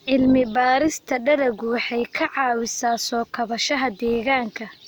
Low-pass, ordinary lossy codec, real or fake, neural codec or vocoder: none; none; real; none